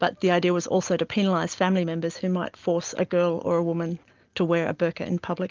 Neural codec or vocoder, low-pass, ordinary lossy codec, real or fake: none; 7.2 kHz; Opus, 32 kbps; real